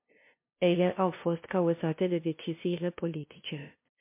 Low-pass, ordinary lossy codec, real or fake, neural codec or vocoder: 3.6 kHz; MP3, 24 kbps; fake; codec, 16 kHz, 0.5 kbps, FunCodec, trained on LibriTTS, 25 frames a second